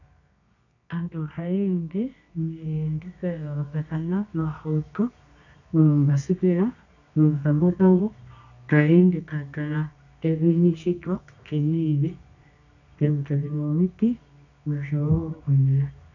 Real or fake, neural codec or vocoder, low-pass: fake; codec, 24 kHz, 0.9 kbps, WavTokenizer, medium music audio release; 7.2 kHz